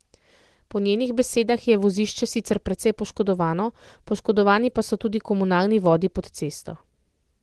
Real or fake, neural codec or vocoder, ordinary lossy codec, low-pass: real; none; Opus, 16 kbps; 10.8 kHz